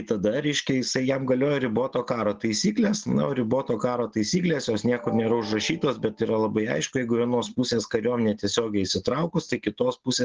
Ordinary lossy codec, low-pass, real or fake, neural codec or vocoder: Opus, 16 kbps; 7.2 kHz; real; none